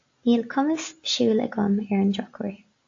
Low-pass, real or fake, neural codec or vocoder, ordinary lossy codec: 7.2 kHz; real; none; AAC, 48 kbps